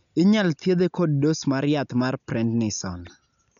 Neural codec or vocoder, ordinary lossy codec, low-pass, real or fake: none; none; 7.2 kHz; real